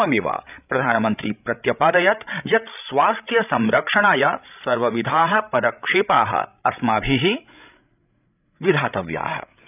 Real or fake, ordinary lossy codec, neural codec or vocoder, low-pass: fake; none; codec, 16 kHz, 16 kbps, FreqCodec, larger model; 3.6 kHz